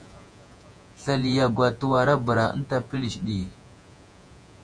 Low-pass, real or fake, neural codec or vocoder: 9.9 kHz; fake; vocoder, 48 kHz, 128 mel bands, Vocos